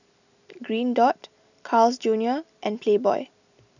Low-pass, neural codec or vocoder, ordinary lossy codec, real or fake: 7.2 kHz; none; none; real